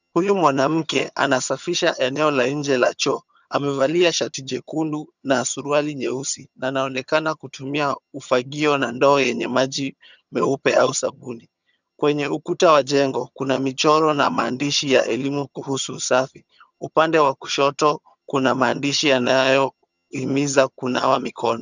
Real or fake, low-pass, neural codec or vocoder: fake; 7.2 kHz; vocoder, 22.05 kHz, 80 mel bands, HiFi-GAN